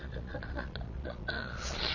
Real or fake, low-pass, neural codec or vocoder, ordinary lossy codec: fake; 7.2 kHz; vocoder, 22.05 kHz, 80 mel bands, Vocos; Opus, 64 kbps